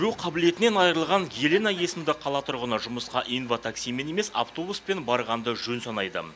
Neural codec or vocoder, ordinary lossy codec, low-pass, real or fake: none; none; none; real